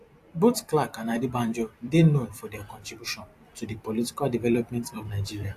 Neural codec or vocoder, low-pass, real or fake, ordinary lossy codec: vocoder, 44.1 kHz, 128 mel bands every 256 samples, BigVGAN v2; 14.4 kHz; fake; AAC, 64 kbps